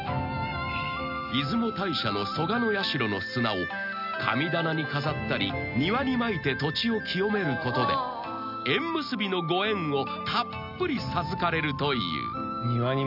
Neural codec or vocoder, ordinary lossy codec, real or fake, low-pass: none; none; real; 5.4 kHz